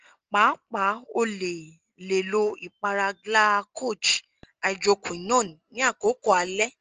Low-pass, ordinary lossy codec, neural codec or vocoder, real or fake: 7.2 kHz; Opus, 24 kbps; none; real